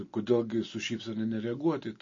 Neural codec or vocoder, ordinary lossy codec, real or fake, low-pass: none; MP3, 32 kbps; real; 7.2 kHz